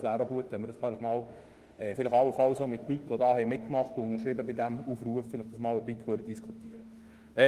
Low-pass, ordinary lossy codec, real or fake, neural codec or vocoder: 14.4 kHz; Opus, 24 kbps; fake; autoencoder, 48 kHz, 32 numbers a frame, DAC-VAE, trained on Japanese speech